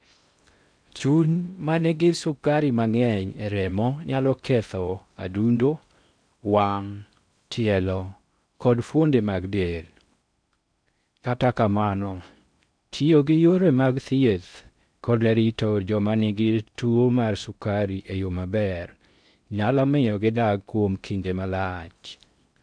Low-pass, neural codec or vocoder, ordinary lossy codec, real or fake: 9.9 kHz; codec, 16 kHz in and 24 kHz out, 0.6 kbps, FocalCodec, streaming, 2048 codes; none; fake